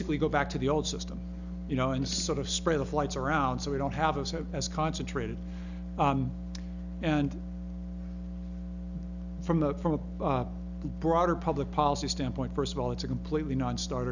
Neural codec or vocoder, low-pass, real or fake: none; 7.2 kHz; real